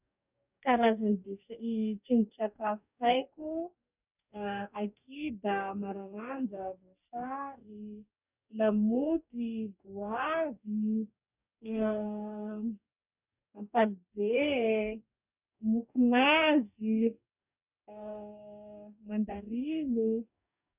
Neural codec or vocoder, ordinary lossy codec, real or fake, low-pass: codec, 44.1 kHz, 2.6 kbps, DAC; none; fake; 3.6 kHz